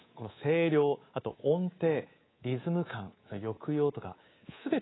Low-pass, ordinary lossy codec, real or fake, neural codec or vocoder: 7.2 kHz; AAC, 16 kbps; fake; codec, 24 kHz, 3.1 kbps, DualCodec